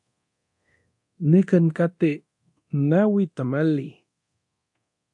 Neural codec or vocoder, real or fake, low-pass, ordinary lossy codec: codec, 24 kHz, 0.9 kbps, DualCodec; fake; 10.8 kHz; AAC, 64 kbps